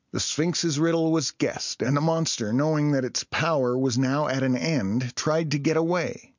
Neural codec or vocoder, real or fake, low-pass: none; real; 7.2 kHz